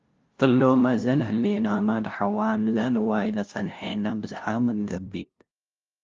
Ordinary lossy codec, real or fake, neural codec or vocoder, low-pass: Opus, 32 kbps; fake; codec, 16 kHz, 0.5 kbps, FunCodec, trained on LibriTTS, 25 frames a second; 7.2 kHz